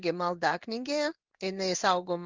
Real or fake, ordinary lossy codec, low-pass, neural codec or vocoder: fake; Opus, 32 kbps; 7.2 kHz; codec, 16 kHz in and 24 kHz out, 1 kbps, XY-Tokenizer